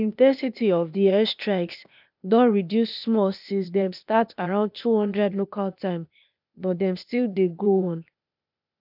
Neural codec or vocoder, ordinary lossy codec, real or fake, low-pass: codec, 16 kHz, 0.8 kbps, ZipCodec; none; fake; 5.4 kHz